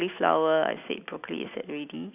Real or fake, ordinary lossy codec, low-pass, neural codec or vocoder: real; none; 3.6 kHz; none